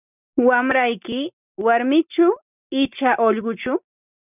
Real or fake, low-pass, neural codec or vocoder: real; 3.6 kHz; none